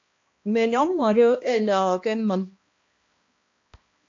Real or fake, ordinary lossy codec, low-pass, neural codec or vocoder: fake; AAC, 48 kbps; 7.2 kHz; codec, 16 kHz, 1 kbps, X-Codec, HuBERT features, trained on balanced general audio